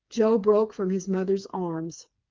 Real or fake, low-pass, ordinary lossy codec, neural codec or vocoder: fake; 7.2 kHz; Opus, 24 kbps; codec, 16 kHz, 4 kbps, FreqCodec, smaller model